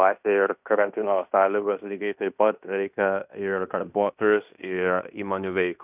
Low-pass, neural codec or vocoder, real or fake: 3.6 kHz; codec, 16 kHz in and 24 kHz out, 0.9 kbps, LongCat-Audio-Codec, fine tuned four codebook decoder; fake